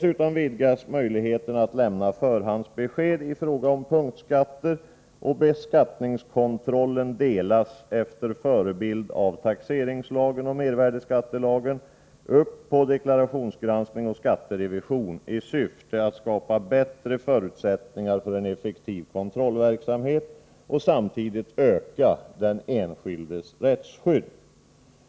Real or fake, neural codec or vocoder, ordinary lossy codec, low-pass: real; none; none; none